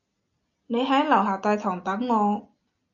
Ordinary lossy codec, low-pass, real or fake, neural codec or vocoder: MP3, 96 kbps; 7.2 kHz; real; none